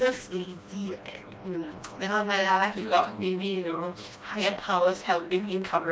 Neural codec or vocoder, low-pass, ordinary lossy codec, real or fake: codec, 16 kHz, 1 kbps, FreqCodec, smaller model; none; none; fake